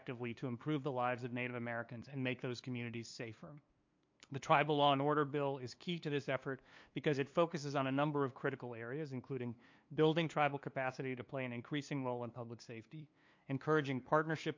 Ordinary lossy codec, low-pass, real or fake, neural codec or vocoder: MP3, 48 kbps; 7.2 kHz; fake; codec, 16 kHz, 2 kbps, FunCodec, trained on LibriTTS, 25 frames a second